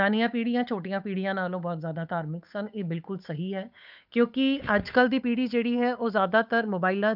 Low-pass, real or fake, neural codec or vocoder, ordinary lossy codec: 5.4 kHz; fake; codec, 16 kHz, 8 kbps, FunCodec, trained on Chinese and English, 25 frames a second; none